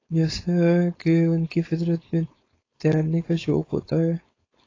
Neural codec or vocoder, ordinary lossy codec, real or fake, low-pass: codec, 16 kHz, 4.8 kbps, FACodec; AAC, 32 kbps; fake; 7.2 kHz